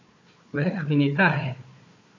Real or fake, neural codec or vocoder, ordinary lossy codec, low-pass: fake; codec, 16 kHz, 4 kbps, FunCodec, trained on Chinese and English, 50 frames a second; MP3, 48 kbps; 7.2 kHz